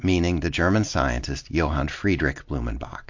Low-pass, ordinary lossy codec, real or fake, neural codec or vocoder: 7.2 kHz; MP3, 48 kbps; real; none